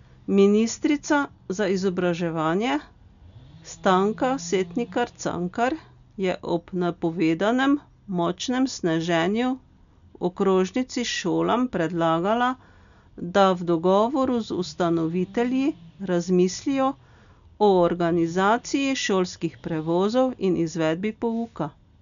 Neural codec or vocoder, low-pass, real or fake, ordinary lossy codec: none; 7.2 kHz; real; none